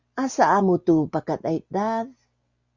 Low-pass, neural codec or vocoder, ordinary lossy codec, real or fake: 7.2 kHz; none; Opus, 64 kbps; real